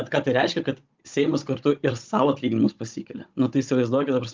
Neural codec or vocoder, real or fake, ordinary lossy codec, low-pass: codec, 16 kHz, 16 kbps, FunCodec, trained on Chinese and English, 50 frames a second; fake; Opus, 24 kbps; 7.2 kHz